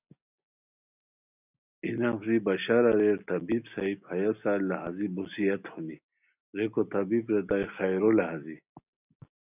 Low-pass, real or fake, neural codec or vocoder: 3.6 kHz; real; none